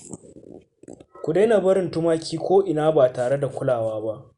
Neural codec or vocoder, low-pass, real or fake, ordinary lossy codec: none; 10.8 kHz; real; Opus, 64 kbps